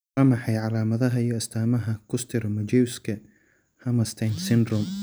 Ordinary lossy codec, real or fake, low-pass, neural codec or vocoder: none; real; none; none